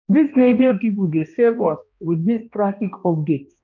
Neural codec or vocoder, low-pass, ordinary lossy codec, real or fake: codec, 16 kHz, 1 kbps, X-Codec, HuBERT features, trained on balanced general audio; 7.2 kHz; none; fake